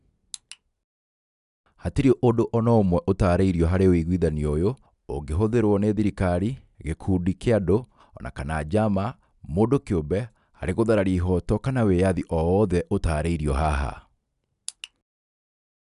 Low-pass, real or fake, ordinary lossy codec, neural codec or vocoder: 10.8 kHz; real; none; none